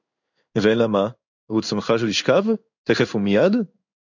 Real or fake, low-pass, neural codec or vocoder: fake; 7.2 kHz; codec, 16 kHz in and 24 kHz out, 1 kbps, XY-Tokenizer